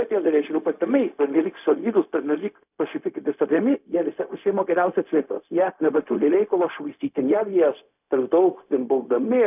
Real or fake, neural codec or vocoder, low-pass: fake; codec, 16 kHz, 0.4 kbps, LongCat-Audio-Codec; 3.6 kHz